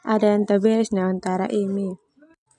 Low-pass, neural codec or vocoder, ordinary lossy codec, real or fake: 10.8 kHz; none; none; real